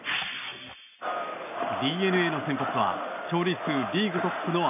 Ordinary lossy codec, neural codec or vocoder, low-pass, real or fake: none; none; 3.6 kHz; real